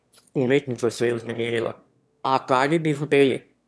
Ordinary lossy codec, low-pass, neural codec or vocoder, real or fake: none; none; autoencoder, 22.05 kHz, a latent of 192 numbers a frame, VITS, trained on one speaker; fake